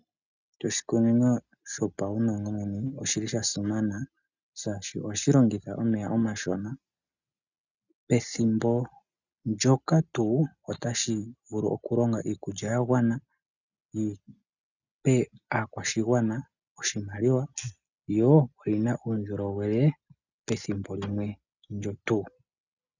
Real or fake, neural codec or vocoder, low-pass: real; none; 7.2 kHz